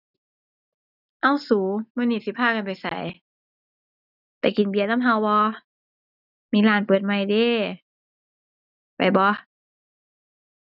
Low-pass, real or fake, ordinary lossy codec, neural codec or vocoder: 5.4 kHz; real; none; none